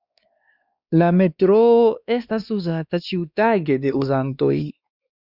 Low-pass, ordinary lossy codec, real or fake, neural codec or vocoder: 5.4 kHz; Opus, 64 kbps; fake; codec, 16 kHz, 2 kbps, X-Codec, WavLM features, trained on Multilingual LibriSpeech